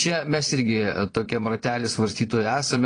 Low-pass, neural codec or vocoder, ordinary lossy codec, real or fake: 9.9 kHz; vocoder, 22.05 kHz, 80 mel bands, Vocos; AAC, 32 kbps; fake